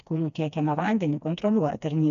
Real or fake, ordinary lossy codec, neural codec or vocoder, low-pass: fake; AAC, 96 kbps; codec, 16 kHz, 2 kbps, FreqCodec, smaller model; 7.2 kHz